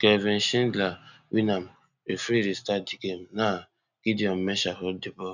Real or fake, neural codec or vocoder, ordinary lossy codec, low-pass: real; none; none; 7.2 kHz